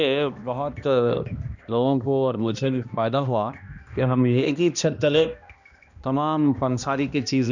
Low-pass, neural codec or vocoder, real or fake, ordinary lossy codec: 7.2 kHz; codec, 16 kHz, 1 kbps, X-Codec, HuBERT features, trained on balanced general audio; fake; none